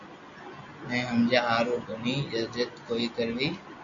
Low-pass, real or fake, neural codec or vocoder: 7.2 kHz; real; none